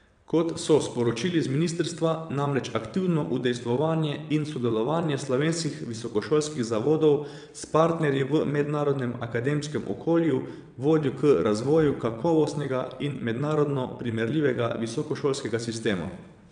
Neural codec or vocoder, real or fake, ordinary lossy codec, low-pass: vocoder, 22.05 kHz, 80 mel bands, WaveNeXt; fake; none; 9.9 kHz